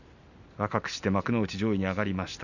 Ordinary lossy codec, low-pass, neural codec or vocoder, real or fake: none; 7.2 kHz; none; real